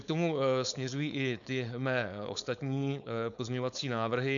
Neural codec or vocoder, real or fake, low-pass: codec, 16 kHz, 4.8 kbps, FACodec; fake; 7.2 kHz